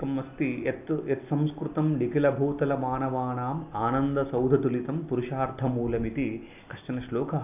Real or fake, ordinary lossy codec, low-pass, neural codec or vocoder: real; AAC, 32 kbps; 3.6 kHz; none